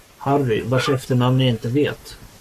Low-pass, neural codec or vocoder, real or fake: 14.4 kHz; codec, 44.1 kHz, 7.8 kbps, Pupu-Codec; fake